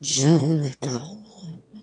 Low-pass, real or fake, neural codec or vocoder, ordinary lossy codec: 9.9 kHz; fake; autoencoder, 22.05 kHz, a latent of 192 numbers a frame, VITS, trained on one speaker; AAC, 64 kbps